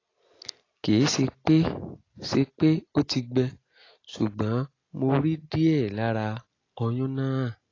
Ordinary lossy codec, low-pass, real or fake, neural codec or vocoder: AAC, 32 kbps; 7.2 kHz; real; none